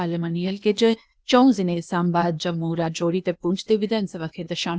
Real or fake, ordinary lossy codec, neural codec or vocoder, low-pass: fake; none; codec, 16 kHz, 0.8 kbps, ZipCodec; none